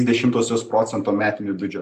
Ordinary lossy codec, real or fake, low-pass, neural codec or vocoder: AAC, 96 kbps; real; 14.4 kHz; none